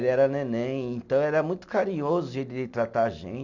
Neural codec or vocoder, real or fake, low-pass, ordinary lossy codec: none; real; 7.2 kHz; none